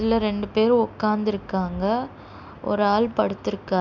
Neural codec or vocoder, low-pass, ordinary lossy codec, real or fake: none; 7.2 kHz; none; real